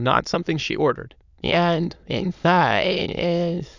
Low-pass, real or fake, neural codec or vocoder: 7.2 kHz; fake; autoencoder, 22.05 kHz, a latent of 192 numbers a frame, VITS, trained on many speakers